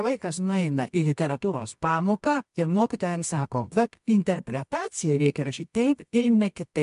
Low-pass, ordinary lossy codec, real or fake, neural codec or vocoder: 10.8 kHz; MP3, 64 kbps; fake; codec, 24 kHz, 0.9 kbps, WavTokenizer, medium music audio release